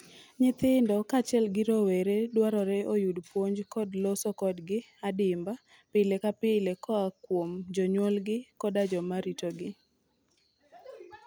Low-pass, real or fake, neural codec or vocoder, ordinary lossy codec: none; real; none; none